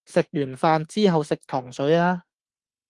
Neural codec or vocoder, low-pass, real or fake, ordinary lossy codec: autoencoder, 48 kHz, 32 numbers a frame, DAC-VAE, trained on Japanese speech; 10.8 kHz; fake; Opus, 24 kbps